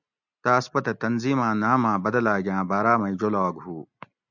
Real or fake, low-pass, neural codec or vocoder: real; 7.2 kHz; none